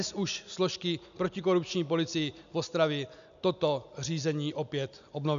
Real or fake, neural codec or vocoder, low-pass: real; none; 7.2 kHz